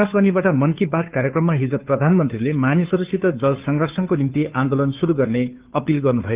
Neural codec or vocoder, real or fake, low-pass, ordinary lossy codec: codec, 16 kHz, 2 kbps, FunCodec, trained on Chinese and English, 25 frames a second; fake; 3.6 kHz; Opus, 32 kbps